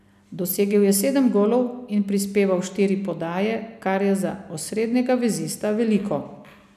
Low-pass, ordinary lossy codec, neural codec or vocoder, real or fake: 14.4 kHz; none; none; real